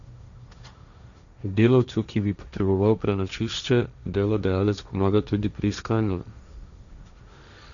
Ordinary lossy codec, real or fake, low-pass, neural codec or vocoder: none; fake; 7.2 kHz; codec, 16 kHz, 1.1 kbps, Voila-Tokenizer